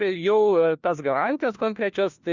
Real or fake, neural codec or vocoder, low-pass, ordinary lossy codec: fake; codec, 16 kHz, 1 kbps, FunCodec, trained on LibriTTS, 50 frames a second; 7.2 kHz; Opus, 64 kbps